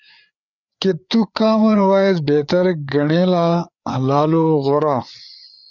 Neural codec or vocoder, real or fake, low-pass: codec, 16 kHz, 4 kbps, FreqCodec, larger model; fake; 7.2 kHz